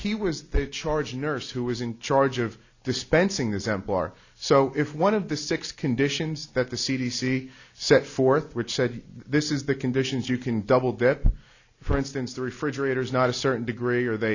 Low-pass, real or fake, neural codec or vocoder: 7.2 kHz; real; none